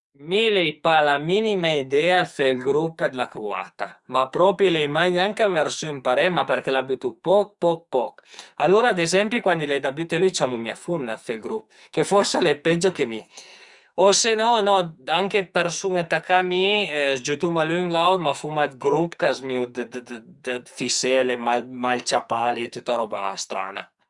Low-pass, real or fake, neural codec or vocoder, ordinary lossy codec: 10.8 kHz; fake; codec, 44.1 kHz, 2.6 kbps, SNAC; Opus, 64 kbps